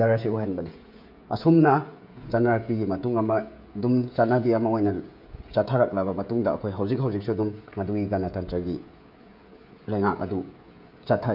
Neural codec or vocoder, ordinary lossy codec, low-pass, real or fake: codec, 16 kHz, 16 kbps, FreqCodec, smaller model; MP3, 48 kbps; 5.4 kHz; fake